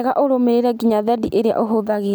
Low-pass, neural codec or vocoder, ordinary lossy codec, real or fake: none; none; none; real